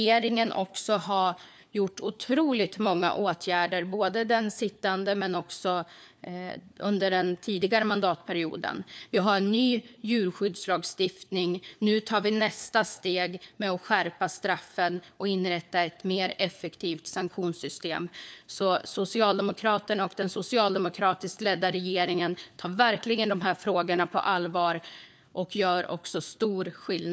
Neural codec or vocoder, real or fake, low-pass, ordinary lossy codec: codec, 16 kHz, 4 kbps, FunCodec, trained on LibriTTS, 50 frames a second; fake; none; none